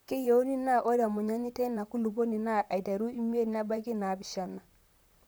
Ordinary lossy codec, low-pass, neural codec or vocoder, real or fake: none; none; vocoder, 44.1 kHz, 128 mel bands, Pupu-Vocoder; fake